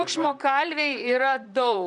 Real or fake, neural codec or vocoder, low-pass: fake; vocoder, 44.1 kHz, 128 mel bands, Pupu-Vocoder; 10.8 kHz